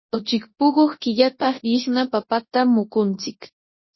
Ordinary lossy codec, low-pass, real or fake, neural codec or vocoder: MP3, 24 kbps; 7.2 kHz; fake; codec, 24 kHz, 0.9 kbps, WavTokenizer, large speech release